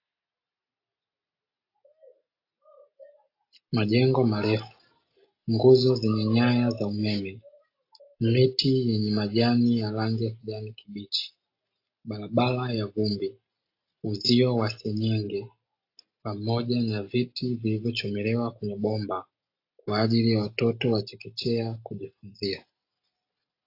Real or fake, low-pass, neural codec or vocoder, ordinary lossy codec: real; 5.4 kHz; none; AAC, 32 kbps